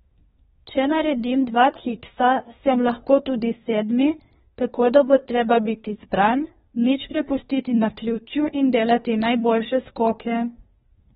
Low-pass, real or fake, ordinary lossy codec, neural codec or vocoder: 10.8 kHz; fake; AAC, 16 kbps; codec, 24 kHz, 1 kbps, SNAC